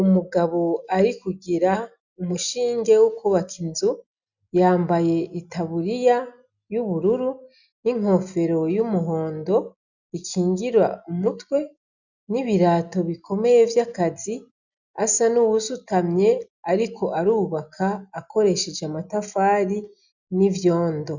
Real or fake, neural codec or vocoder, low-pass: real; none; 7.2 kHz